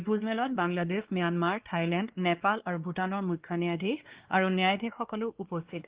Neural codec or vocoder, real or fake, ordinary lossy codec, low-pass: codec, 16 kHz, 2 kbps, X-Codec, WavLM features, trained on Multilingual LibriSpeech; fake; Opus, 16 kbps; 3.6 kHz